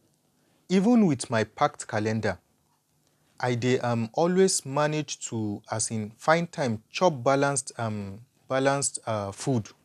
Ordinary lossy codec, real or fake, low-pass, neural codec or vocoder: none; real; 14.4 kHz; none